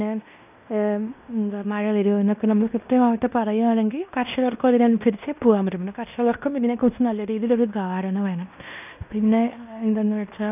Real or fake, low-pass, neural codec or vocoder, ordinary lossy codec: fake; 3.6 kHz; codec, 16 kHz in and 24 kHz out, 0.9 kbps, LongCat-Audio-Codec, fine tuned four codebook decoder; none